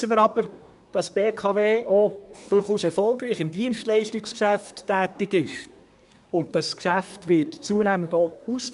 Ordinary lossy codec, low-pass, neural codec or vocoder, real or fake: none; 10.8 kHz; codec, 24 kHz, 1 kbps, SNAC; fake